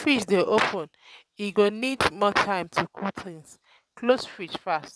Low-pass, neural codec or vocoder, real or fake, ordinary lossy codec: none; vocoder, 22.05 kHz, 80 mel bands, WaveNeXt; fake; none